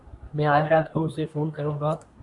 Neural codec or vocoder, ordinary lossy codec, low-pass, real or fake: codec, 24 kHz, 1 kbps, SNAC; MP3, 96 kbps; 10.8 kHz; fake